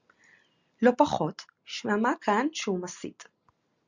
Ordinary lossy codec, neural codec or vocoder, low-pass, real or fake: Opus, 64 kbps; none; 7.2 kHz; real